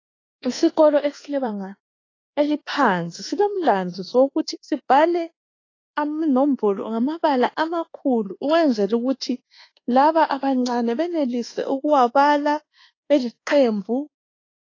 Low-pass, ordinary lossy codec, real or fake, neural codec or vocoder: 7.2 kHz; AAC, 32 kbps; fake; codec, 24 kHz, 1.2 kbps, DualCodec